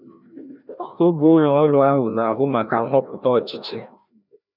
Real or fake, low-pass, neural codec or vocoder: fake; 5.4 kHz; codec, 16 kHz, 1 kbps, FreqCodec, larger model